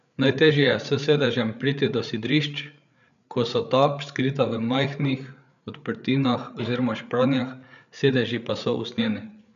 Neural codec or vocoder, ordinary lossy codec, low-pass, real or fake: codec, 16 kHz, 8 kbps, FreqCodec, larger model; none; 7.2 kHz; fake